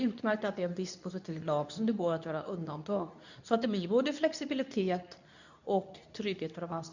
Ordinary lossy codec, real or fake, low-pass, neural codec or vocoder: none; fake; 7.2 kHz; codec, 24 kHz, 0.9 kbps, WavTokenizer, medium speech release version 2